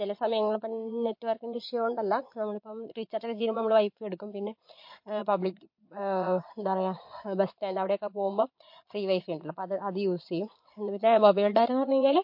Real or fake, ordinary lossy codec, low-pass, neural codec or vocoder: fake; MP3, 32 kbps; 5.4 kHz; vocoder, 22.05 kHz, 80 mel bands, Vocos